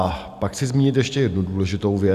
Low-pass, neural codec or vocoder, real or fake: 14.4 kHz; none; real